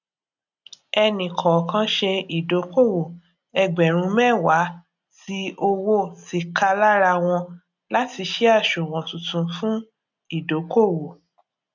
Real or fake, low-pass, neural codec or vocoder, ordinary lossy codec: real; 7.2 kHz; none; none